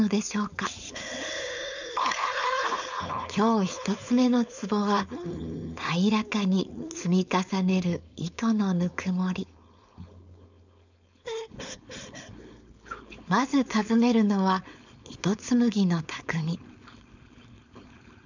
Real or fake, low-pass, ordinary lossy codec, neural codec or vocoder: fake; 7.2 kHz; none; codec, 16 kHz, 4.8 kbps, FACodec